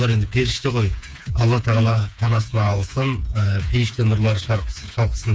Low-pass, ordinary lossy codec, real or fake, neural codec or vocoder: none; none; fake; codec, 16 kHz, 4 kbps, FreqCodec, smaller model